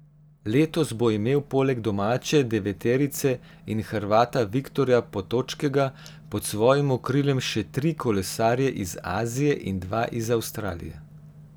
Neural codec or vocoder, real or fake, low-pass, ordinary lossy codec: none; real; none; none